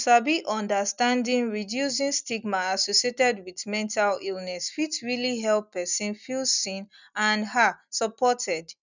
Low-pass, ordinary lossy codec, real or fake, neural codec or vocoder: 7.2 kHz; none; real; none